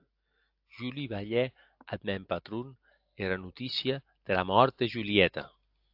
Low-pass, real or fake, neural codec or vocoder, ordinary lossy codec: 5.4 kHz; real; none; AAC, 48 kbps